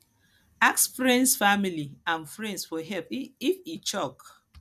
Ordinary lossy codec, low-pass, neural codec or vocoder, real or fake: none; 14.4 kHz; none; real